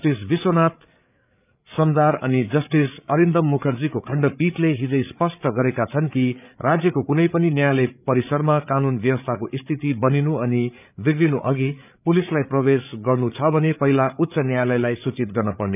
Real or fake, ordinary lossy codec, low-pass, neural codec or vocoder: fake; none; 3.6 kHz; codec, 16 kHz, 8 kbps, FreqCodec, larger model